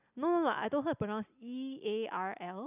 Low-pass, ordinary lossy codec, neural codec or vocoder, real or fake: 3.6 kHz; none; none; real